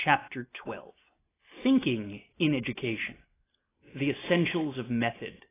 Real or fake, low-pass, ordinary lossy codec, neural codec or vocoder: real; 3.6 kHz; AAC, 16 kbps; none